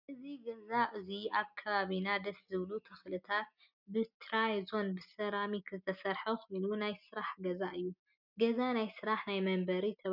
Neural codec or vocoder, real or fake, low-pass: none; real; 5.4 kHz